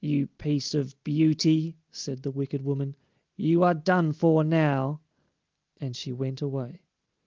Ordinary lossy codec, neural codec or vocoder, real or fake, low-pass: Opus, 32 kbps; none; real; 7.2 kHz